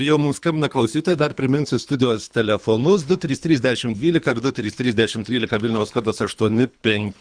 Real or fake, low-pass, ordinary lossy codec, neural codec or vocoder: fake; 9.9 kHz; Opus, 64 kbps; codec, 24 kHz, 3 kbps, HILCodec